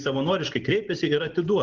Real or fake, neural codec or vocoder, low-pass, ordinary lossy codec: real; none; 7.2 kHz; Opus, 16 kbps